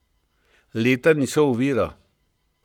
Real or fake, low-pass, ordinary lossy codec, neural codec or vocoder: fake; 19.8 kHz; none; codec, 44.1 kHz, 7.8 kbps, Pupu-Codec